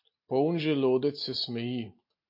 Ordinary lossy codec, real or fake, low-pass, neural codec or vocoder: MP3, 32 kbps; real; 5.4 kHz; none